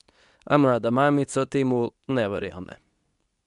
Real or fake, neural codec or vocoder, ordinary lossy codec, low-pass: fake; codec, 24 kHz, 0.9 kbps, WavTokenizer, medium speech release version 2; none; 10.8 kHz